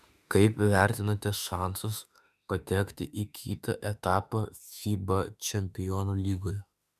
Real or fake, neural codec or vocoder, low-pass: fake; autoencoder, 48 kHz, 32 numbers a frame, DAC-VAE, trained on Japanese speech; 14.4 kHz